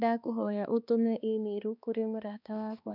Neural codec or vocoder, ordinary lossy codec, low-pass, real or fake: codec, 16 kHz, 2 kbps, X-Codec, HuBERT features, trained on balanced general audio; none; 5.4 kHz; fake